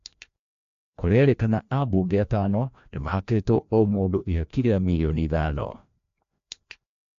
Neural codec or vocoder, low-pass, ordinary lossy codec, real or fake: codec, 16 kHz, 1 kbps, FreqCodec, larger model; 7.2 kHz; AAC, 64 kbps; fake